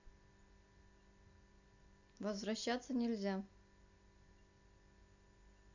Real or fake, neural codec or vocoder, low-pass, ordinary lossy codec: real; none; 7.2 kHz; none